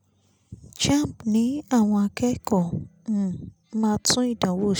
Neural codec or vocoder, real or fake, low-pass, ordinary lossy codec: none; real; none; none